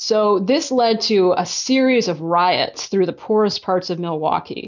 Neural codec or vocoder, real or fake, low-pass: none; real; 7.2 kHz